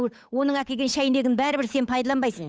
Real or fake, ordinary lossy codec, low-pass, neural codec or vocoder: fake; none; none; codec, 16 kHz, 8 kbps, FunCodec, trained on Chinese and English, 25 frames a second